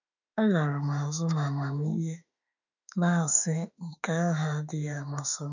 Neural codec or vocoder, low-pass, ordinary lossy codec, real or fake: autoencoder, 48 kHz, 32 numbers a frame, DAC-VAE, trained on Japanese speech; 7.2 kHz; none; fake